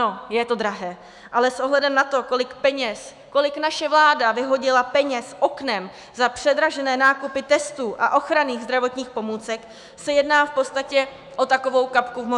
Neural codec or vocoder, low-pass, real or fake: autoencoder, 48 kHz, 128 numbers a frame, DAC-VAE, trained on Japanese speech; 10.8 kHz; fake